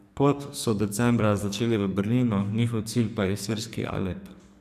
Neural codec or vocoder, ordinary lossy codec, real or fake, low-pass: codec, 44.1 kHz, 2.6 kbps, SNAC; none; fake; 14.4 kHz